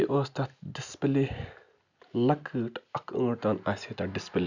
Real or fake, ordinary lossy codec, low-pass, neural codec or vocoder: real; none; 7.2 kHz; none